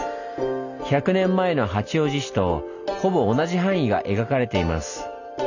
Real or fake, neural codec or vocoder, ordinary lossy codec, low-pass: real; none; none; 7.2 kHz